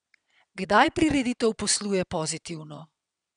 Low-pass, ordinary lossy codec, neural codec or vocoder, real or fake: 9.9 kHz; none; vocoder, 22.05 kHz, 80 mel bands, WaveNeXt; fake